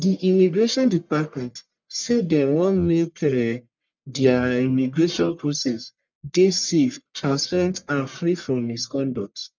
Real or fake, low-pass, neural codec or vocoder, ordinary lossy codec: fake; 7.2 kHz; codec, 44.1 kHz, 1.7 kbps, Pupu-Codec; none